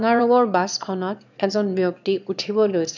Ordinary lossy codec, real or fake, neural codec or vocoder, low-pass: none; fake; autoencoder, 22.05 kHz, a latent of 192 numbers a frame, VITS, trained on one speaker; 7.2 kHz